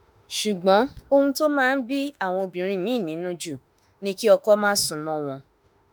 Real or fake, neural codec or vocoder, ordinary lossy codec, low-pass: fake; autoencoder, 48 kHz, 32 numbers a frame, DAC-VAE, trained on Japanese speech; none; none